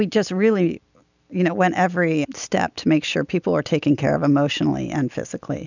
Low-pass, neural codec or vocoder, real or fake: 7.2 kHz; none; real